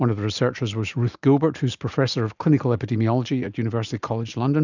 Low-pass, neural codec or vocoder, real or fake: 7.2 kHz; none; real